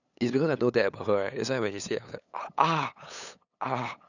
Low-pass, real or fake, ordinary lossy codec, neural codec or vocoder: 7.2 kHz; fake; none; codec, 16 kHz, 8 kbps, FunCodec, trained on LibriTTS, 25 frames a second